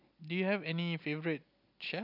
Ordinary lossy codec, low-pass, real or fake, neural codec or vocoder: none; 5.4 kHz; real; none